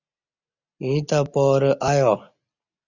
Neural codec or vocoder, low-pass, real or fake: none; 7.2 kHz; real